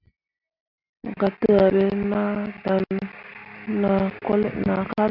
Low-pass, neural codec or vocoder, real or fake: 5.4 kHz; none; real